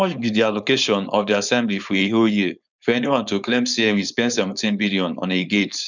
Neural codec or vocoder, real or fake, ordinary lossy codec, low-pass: codec, 16 kHz, 4.8 kbps, FACodec; fake; none; 7.2 kHz